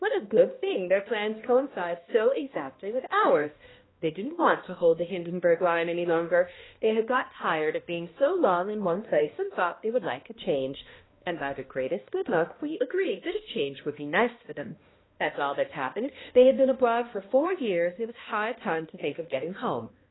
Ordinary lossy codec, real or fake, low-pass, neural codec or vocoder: AAC, 16 kbps; fake; 7.2 kHz; codec, 16 kHz, 1 kbps, X-Codec, HuBERT features, trained on balanced general audio